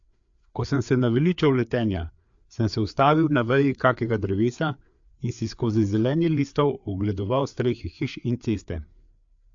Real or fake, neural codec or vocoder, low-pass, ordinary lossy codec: fake; codec, 16 kHz, 4 kbps, FreqCodec, larger model; 7.2 kHz; AAC, 64 kbps